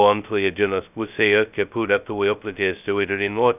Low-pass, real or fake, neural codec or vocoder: 3.6 kHz; fake; codec, 16 kHz, 0.2 kbps, FocalCodec